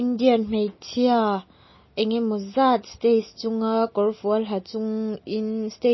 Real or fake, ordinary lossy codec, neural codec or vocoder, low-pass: real; MP3, 24 kbps; none; 7.2 kHz